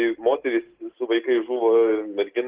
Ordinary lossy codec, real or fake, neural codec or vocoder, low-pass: Opus, 16 kbps; real; none; 3.6 kHz